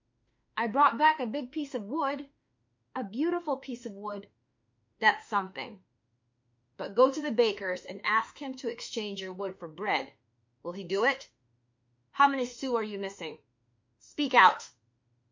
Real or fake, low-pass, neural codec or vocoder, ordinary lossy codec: fake; 7.2 kHz; autoencoder, 48 kHz, 32 numbers a frame, DAC-VAE, trained on Japanese speech; MP3, 48 kbps